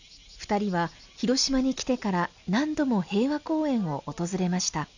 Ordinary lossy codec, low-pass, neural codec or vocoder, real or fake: none; 7.2 kHz; none; real